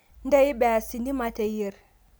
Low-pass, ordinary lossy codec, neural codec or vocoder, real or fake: none; none; none; real